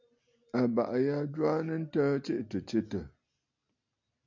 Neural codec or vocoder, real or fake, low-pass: none; real; 7.2 kHz